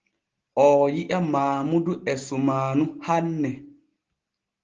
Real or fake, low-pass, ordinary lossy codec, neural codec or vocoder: real; 7.2 kHz; Opus, 16 kbps; none